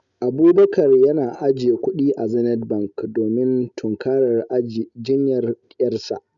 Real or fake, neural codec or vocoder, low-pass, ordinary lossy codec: real; none; 7.2 kHz; none